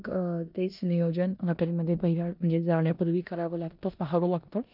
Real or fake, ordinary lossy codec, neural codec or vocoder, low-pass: fake; none; codec, 16 kHz in and 24 kHz out, 0.9 kbps, LongCat-Audio-Codec, four codebook decoder; 5.4 kHz